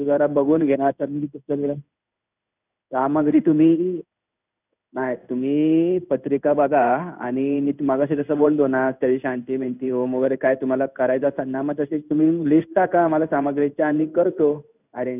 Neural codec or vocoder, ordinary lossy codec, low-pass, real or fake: codec, 16 kHz in and 24 kHz out, 1 kbps, XY-Tokenizer; none; 3.6 kHz; fake